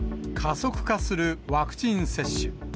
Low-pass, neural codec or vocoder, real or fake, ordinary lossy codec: none; none; real; none